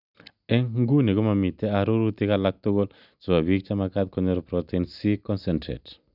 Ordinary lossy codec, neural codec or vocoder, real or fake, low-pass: none; none; real; 5.4 kHz